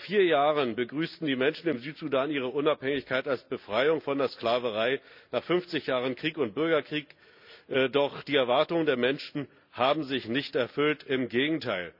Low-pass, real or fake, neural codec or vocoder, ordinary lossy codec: 5.4 kHz; real; none; none